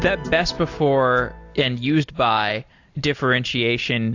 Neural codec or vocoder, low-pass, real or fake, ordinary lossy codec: none; 7.2 kHz; real; AAC, 48 kbps